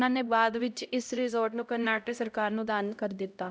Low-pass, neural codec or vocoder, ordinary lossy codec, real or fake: none; codec, 16 kHz, 0.5 kbps, X-Codec, HuBERT features, trained on LibriSpeech; none; fake